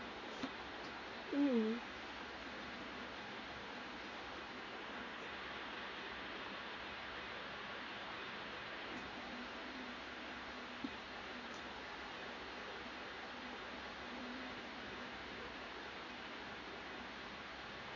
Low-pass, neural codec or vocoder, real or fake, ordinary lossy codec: 7.2 kHz; codec, 16 kHz in and 24 kHz out, 1 kbps, XY-Tokenizer; fake; MP3, 32 kbps